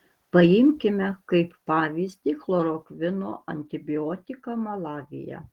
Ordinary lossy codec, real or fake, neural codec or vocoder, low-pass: Opus, 16 kbps; real; none; 19.8 kHz